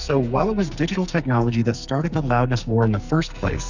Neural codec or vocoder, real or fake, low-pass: codec, 44.1 kHz, 2.6 kbps, SNAC; fake; 7.2 kHz